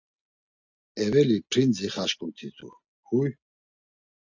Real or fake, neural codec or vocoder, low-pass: real; none; 7.2 kHz